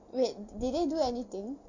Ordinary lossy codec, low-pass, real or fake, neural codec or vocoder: none; 7.2 kHz; real; none